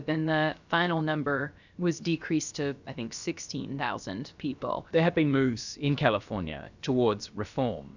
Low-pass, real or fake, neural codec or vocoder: 7.2 kHz; fake; codec, 16 kHz, about 1 kbps, DyCAST, with the encoder's durations